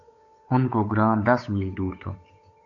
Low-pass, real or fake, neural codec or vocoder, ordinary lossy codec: 7.2 kHz; fake; codec, 16 kHz, 8 kbps, FunCodec, trained on Chinese and English, 25 frames a second; AAC, 48 kbps